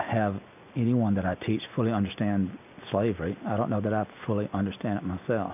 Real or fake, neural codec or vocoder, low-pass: real; none; 3.6 kHz